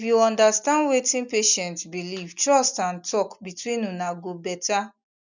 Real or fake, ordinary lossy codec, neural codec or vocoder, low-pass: real; none; none; 7.2 kHz